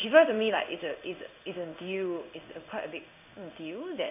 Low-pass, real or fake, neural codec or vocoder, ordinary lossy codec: 3.6 kHz; fake; codec, 16 kHz in and 24 kHz out, 1 kbps, XY-Tokenizer; none